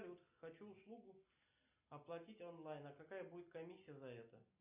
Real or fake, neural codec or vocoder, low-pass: real; none; 3.6 kHz